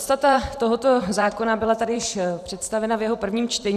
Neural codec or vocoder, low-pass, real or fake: vocoder, 44.1 kHz, 128 mel bands every 512 samples, BigVGAN v2; 14.4 kHz; fake